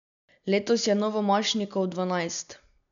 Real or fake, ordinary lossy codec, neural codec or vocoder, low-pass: real; none; none; 7.2 kHz